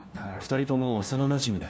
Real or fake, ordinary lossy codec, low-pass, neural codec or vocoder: fake; none; none; codec, 16 kHz, 1 kbps, FunCodec, trained on LibriTTS, 50 frames a second